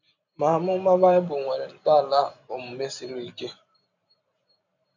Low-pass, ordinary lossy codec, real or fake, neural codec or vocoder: 7.2 kHz; none; fake; vocoder, 44.1 kHz, 128 mel bands every 512 samples, BigVGAN v2